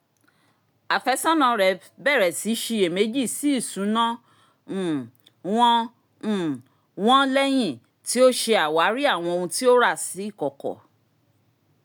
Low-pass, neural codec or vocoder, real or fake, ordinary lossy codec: none; none; real; none